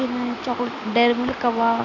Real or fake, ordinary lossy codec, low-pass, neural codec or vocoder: real; none; 7.2 kHz; none